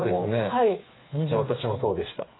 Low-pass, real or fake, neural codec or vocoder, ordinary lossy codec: 7.2 kHz; fake; codec, 16 kHz, 4 kbps, FreqCodec, smaller model; AAC, 16 kbps